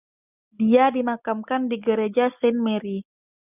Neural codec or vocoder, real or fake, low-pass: none; real; 3.6 kHz